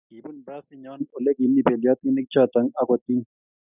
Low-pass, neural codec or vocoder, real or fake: 3.6 kHz; none; real